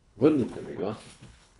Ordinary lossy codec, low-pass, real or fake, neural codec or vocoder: none; 10.8 kHz; fake; codec, 24 kHz, 3 kbps, HILCodec